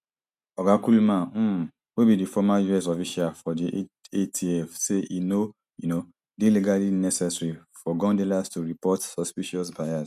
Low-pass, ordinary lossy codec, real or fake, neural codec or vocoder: 14.4 kHz; none; real; none